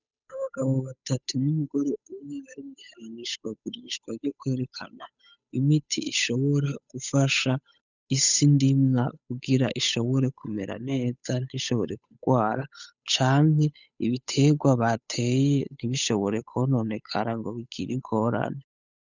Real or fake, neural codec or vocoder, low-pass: fake; codec, 16 kHz, 8 kbps, FunCodec, trained on Chinese and English, 25 frames a second; 7.2 kHz